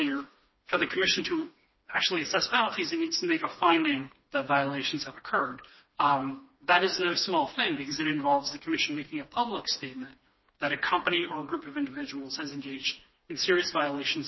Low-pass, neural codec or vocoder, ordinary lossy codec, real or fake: 7.2 kHz; codec, 16 kHz, 2 kbps, FreqCodec, smaller model; MP3, 24 kbps; fake